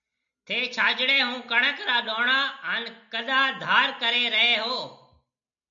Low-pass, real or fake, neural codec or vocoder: 7.2 kHz; real; none